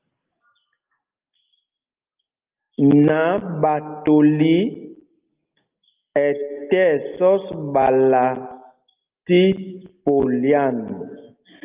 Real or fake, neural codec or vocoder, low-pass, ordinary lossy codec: real; none; 3.6 kHz; Opus, 24 kbps